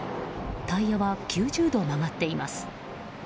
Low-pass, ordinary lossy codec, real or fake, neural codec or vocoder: none; none; real; none